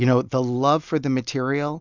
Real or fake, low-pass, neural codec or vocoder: real; 7.2 kHz; none